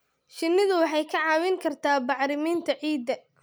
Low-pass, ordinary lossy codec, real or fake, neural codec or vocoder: none; none; real; none